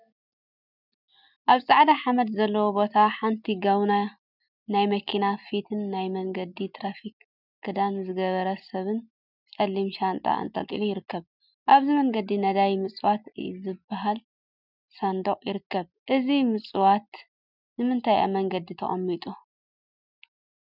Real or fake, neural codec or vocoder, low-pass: real; none; 5.4 kHz